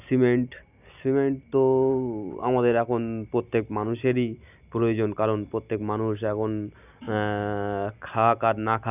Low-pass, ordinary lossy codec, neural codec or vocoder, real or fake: 3.6 kHz; none; none; real